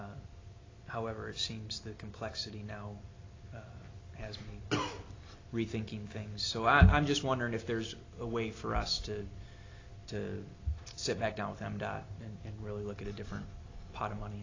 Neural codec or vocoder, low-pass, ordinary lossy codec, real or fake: none; 7.2 kHz; AAC, 32 kbps; real